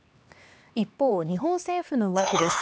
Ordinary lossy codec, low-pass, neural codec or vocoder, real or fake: none; none; codec, 16 kHz, 2 kbps, X-Codec, HuBERT features, trained on LibriSpeech; fake